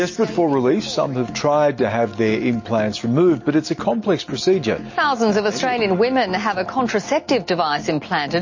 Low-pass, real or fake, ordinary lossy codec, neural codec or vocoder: 7.2 kHz; real; MP3, 32 kbps; none